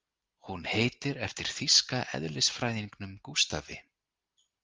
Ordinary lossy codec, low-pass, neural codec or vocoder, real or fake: Opus, 32 kbps; 7.2 kHz; none; real